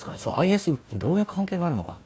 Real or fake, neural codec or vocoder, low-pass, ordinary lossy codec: fake; codec, 16 kHz, 1 kbps, FunCodec, trained on Chinese and English, 50 frames a second; none; none